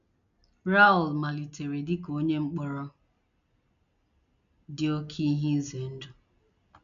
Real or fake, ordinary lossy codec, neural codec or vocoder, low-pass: real; MP3, 96 kbps; none; 7.2 kHz